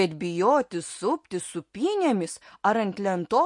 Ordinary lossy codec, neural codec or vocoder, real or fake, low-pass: MP3, 48 kbps; none; real; 10.8 kHz